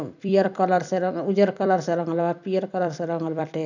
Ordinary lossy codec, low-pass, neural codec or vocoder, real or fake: none; 7.2 kHz; vocoder, 44.1 kHz, 128 mel bands every 256 samples, BigVGAN v2; fake